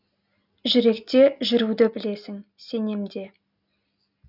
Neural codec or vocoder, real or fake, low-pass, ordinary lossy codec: none; real; 5.4 kHz; none